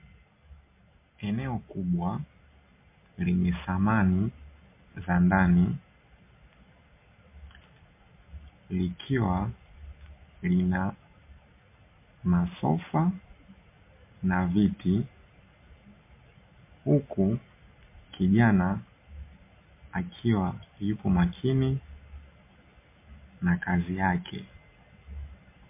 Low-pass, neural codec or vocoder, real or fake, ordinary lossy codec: 3.6 kHz; none; real; MP3, 24 kbps